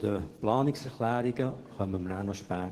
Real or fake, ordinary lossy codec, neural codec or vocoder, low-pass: fake; Opus, 16 kbps; codec, 44.1 kHz, 7.8 kbps, DAC; 14.4 kHz